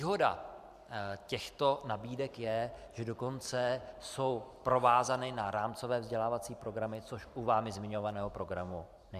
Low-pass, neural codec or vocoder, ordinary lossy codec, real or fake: 14.4 kHz; vocoder, 44.1 kHz, 128 mel bands every 512 samples, BigVGAN v2; Opus, 64 kbps; fake